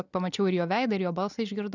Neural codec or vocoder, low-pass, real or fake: none; 7.2 kHz; real